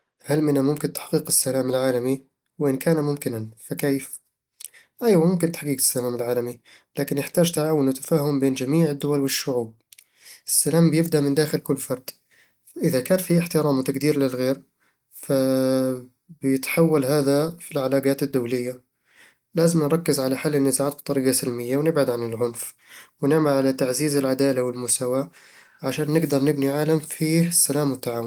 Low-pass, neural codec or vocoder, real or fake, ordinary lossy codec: 19.8 kHz; none; real; Opus, 24 kbps